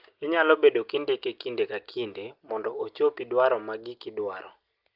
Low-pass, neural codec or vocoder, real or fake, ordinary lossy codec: 5.4 kHz; none; real; Opus, 32 kbps